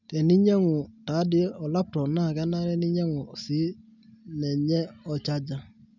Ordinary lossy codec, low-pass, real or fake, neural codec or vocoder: none; 7.2 kHz; real; none